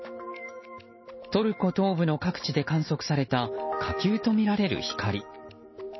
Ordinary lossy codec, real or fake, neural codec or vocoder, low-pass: MP3, 24 kbps; real; none; 7.2 kHz